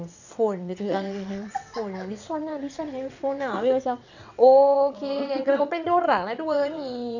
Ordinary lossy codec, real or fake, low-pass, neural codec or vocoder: none; fake; 7.2 kHz; vocoder, 22.05 kHz, 80 mel bands, WaveNeXt